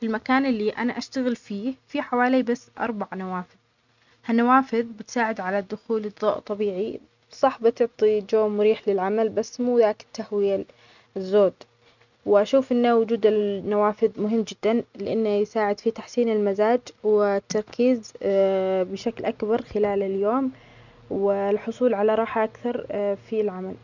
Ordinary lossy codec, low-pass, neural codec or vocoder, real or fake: none; 7.2 kHz; none; real